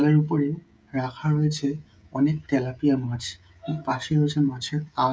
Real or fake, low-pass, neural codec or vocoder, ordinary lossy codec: fake; none; codec, 16 kHz, 16 kbps, FreqCodec, smaller model; none